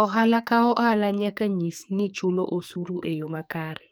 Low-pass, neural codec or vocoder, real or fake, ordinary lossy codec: none; codec, 44.1 kHz, 2.6 kbps, SNAC; fake; none